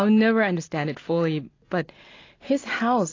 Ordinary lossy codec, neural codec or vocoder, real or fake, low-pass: AAC, 32 kbps; none; real; 7.2 kHz